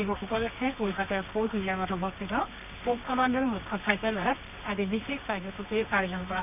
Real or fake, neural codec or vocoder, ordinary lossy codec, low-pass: fake; codec, 24 kHz, 0.9 kbps, WavTokenizer, medium music audio release; none; 3.6 kHz